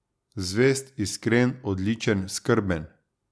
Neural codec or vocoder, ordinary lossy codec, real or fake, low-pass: none; none; real; none